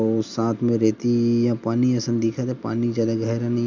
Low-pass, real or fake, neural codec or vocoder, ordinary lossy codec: 7.2 kHz; real; none; none